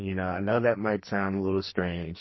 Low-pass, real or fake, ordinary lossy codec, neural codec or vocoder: 7.2 kHz; fake; MP3, 24 kbps; codec, 44.1 kHz, 2.6 kbps, SNAC